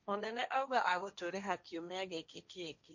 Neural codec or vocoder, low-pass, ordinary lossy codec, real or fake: codec, 16 kHz, 1.1 kbps, Voila-Tokenizer; 7.2 kHz; none; fake